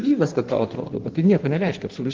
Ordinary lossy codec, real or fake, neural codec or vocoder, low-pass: Opus, 16 kbps; fake; codec, 16 kHz in and 24 kHz out, 1.1 kbps, FireRedTTS-2 codec; 7.2 kHz